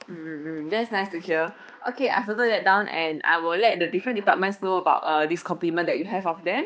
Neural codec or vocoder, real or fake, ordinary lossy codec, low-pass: codec, 16 kHz, 2 kbps, X-Codec, HuBERT features, trained on balanced general audio; fake; none; none